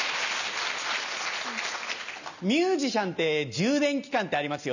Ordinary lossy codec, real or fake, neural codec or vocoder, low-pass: none; real; none; 7.2 kHz